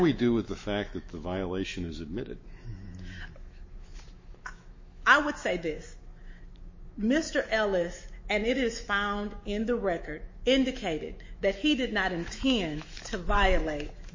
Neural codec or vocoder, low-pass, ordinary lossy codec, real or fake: autoencoder, 48 kHz, 128 numbers a frame, DAC-VAE, trained on Japanese speech; 7.2 kHz; MP3, 32 kbps; fake